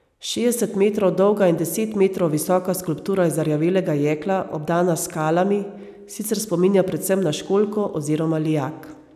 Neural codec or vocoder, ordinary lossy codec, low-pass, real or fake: none; none; 14.4 kHz; real